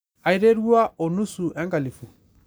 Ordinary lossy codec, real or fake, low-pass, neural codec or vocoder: none; real; none; none